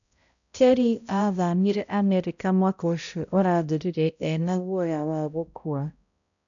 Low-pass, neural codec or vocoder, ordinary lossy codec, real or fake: 7.2 kHz; codec, 16 kHz, 0.5 kbps, X-Codec, HuBERT features, trained on balanced general audio; none; fake